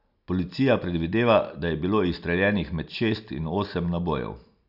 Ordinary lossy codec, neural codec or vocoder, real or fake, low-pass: none; none; real; 5.4 kHz